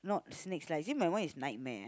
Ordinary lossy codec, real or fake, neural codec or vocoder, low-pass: none; real; none; none